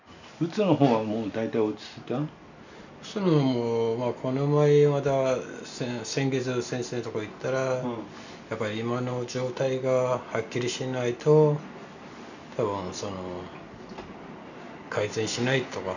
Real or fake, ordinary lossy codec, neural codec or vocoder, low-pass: real; none; none; 7.2 kHz